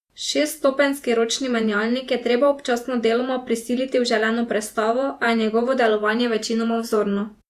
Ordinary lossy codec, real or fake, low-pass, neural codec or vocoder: Opus, 64 kbps; fake; 14.4 kHz; vocoder, 48 kHz, 128 mel bands, Vocos